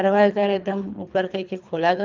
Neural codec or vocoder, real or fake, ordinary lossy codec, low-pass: codec, 24 kHz, 3 kbps, HILCodec; fake; Opus, 24 kbps; 7.2 kHz